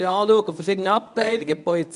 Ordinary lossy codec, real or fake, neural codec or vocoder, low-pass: none; fake; codec, 24 kHz, 0.9 kbps, WavTokenizer, medium speech release version 1; 10.8 kHz